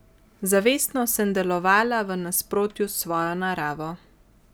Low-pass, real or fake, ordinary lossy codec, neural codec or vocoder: none; real; none; none